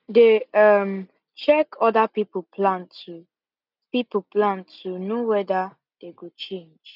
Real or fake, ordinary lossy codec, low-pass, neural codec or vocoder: real; none; 5.4 kHz; none